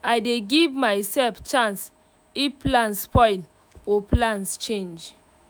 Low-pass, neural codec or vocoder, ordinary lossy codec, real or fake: none; autoencoder, 48 kHz, 128 numbers a frame, DAC-VAE, trained on Japanese speech; none; fake